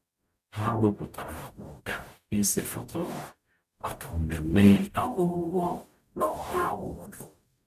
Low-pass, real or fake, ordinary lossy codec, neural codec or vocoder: 14.4 kHz; fake; MP3, 96 kbps; codec, 44.1 kHz, 0.9 kbps, DAC